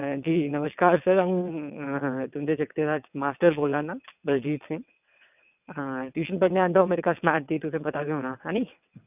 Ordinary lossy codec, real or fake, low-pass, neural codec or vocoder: none; fake; 3.6 kHz; vocoder, 22.05 kHz, 80 mel bands, WaveNeXt